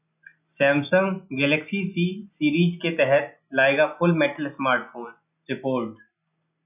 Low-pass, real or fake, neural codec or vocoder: 3.6 kHz; real; none